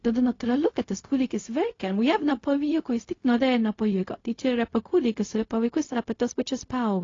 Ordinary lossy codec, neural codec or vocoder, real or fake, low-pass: AAC, 32 kbps; codec, 16 kHz, 0.4 kbps, LongCat-Audio-Codec; fake; 7.2 kHz